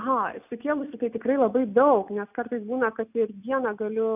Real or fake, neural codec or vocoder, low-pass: real; none; 3.6 kHz